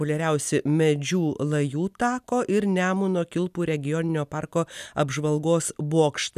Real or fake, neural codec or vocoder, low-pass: real; none; 14.4 kHz